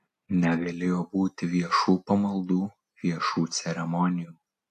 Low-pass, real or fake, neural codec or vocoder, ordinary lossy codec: 14.4 kHz; real; none; AAC, 64 kbps